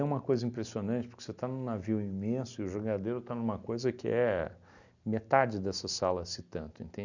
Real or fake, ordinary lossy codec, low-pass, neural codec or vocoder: real; none; 7.2 kHz; none